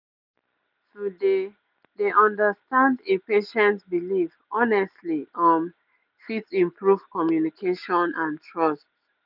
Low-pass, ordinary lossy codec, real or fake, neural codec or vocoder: 5.4 kHz; none; real; none